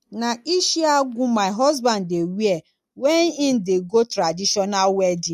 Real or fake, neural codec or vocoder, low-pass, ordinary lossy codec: real; none; 14.4 kHz; MP3, 64 kbps